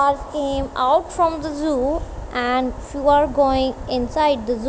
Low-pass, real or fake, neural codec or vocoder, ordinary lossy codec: none; real; none; none